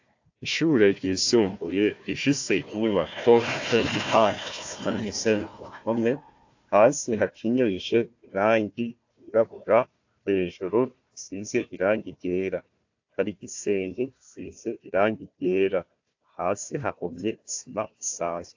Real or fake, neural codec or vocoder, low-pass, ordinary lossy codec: fake; codec, 16 kHz, 1 kbps, FunCodec, trained on Chinese and English, 50 frames a second; 7.2 kHz; AAC, 48 kbps